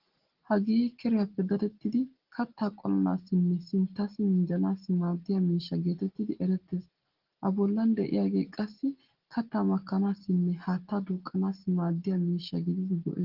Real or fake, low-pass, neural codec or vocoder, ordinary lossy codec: real; 5.4 kHz; none; Opus, 16 kbps